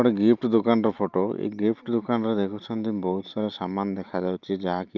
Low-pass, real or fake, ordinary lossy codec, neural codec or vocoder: 7.2 kHz; real; Opus, 32 kbps; none